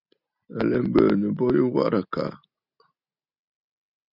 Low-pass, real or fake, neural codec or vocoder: 5.4 kHz; real; none